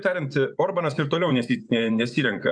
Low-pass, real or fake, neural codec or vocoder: 9.9 kHz; fake; vocoder, 24 kHz, 100 mel bands, Vocos